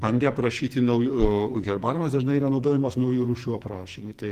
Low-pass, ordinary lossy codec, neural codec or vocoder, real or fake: 14.4 kHz; Opus, 24 kbps; codec, 44.1 kHz, 2.6 kbps, SNAC; fake